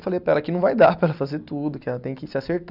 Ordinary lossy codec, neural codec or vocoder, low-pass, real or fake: none; none; 5.4 kHz; real